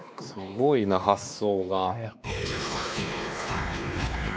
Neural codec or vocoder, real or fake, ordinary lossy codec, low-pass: codec, 16 kHz, 2 kbps, X-Codec, WavLM features, trained on Multilingual LibriSpeech; fake; none; none